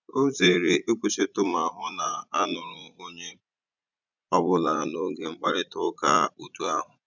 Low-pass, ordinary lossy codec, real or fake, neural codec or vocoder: 7.2 kHz; none; fake; vocoder, 44.1 kHz, 80 mel bands, Vocos